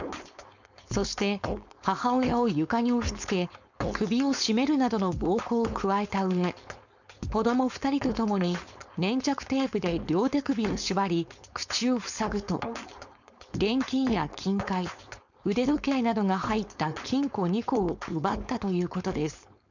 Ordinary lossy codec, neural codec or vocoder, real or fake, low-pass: none; codec, 16 kHz, 4.8 kbps, FACodec; fake; 7.2 kHz